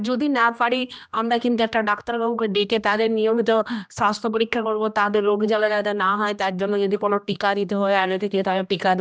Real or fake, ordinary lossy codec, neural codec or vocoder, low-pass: fake; none; codec, 16 kHz, 1 kbps, X-Codec, HuBERT features, trained on general audio; none